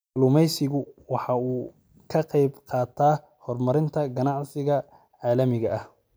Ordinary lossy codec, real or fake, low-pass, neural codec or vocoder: none; real; none; none